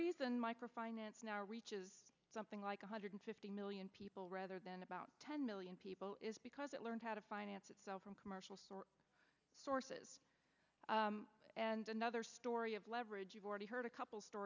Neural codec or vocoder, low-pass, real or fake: none; 7.2 kHz; real